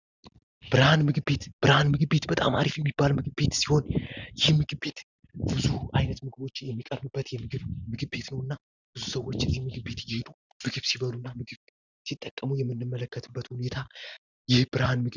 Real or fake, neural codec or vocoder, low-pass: real; none; 7.2 kHz